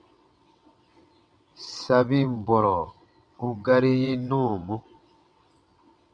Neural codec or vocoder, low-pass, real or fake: vocoder, 22.05 kHz, 80 mel bands, WaveNeXt; 9.9 kHz; fake